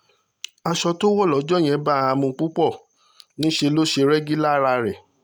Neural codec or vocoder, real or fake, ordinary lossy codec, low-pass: none; real; none; none